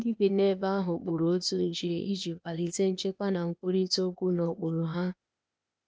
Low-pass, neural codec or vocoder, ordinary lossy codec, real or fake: none; codec, 16 kHz, 0.8 kbps, ZipCodec; none; fake